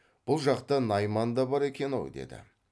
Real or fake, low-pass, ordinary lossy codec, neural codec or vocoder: real; none; none; none